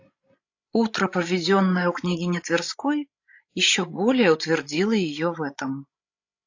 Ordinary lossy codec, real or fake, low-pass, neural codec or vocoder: AAC, 48 kbps; fake; 7.2 kHz; codec, 16 kHz, 16 kbps, FreqCodec, larger model